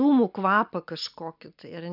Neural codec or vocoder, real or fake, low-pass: none; real; 5.4 kHz